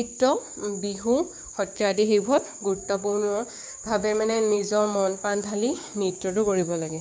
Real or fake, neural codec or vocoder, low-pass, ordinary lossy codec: fake; codec, 16 kHz, 6 kbps, DAC; none; none